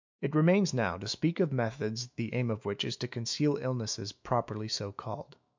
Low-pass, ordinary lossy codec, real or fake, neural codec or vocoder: 7.2 kHz; MP3, 64 kbps; fake; autoencoder, 48 kHz, 128 numbers a frame, DAC-VAE, trained on Japanese speech